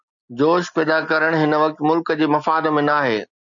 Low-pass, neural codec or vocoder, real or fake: 7.2 kHz; none; real